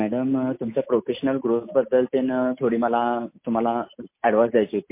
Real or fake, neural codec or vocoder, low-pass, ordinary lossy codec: real; none; 3.6 kHz; MP3, 24 kbps